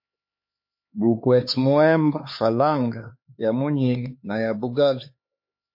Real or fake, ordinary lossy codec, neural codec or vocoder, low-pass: fake; MP3, 32 kbps; codec, 16 kHz, 4 kbps, X-Codec, HuBERT features, trained on LibriSpeech; 5.4 kHz